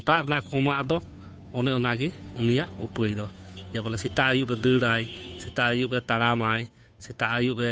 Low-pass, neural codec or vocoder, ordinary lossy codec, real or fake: none; codec, 16 kHz, 2 kbps, FunCodec, trained on Chinese and English, 25 frames a second; none; fake